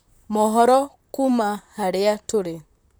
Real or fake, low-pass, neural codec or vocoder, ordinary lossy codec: fake; none; vocoder, 44.1 kHz, 128 mel bands, Pupu-Vocoder; none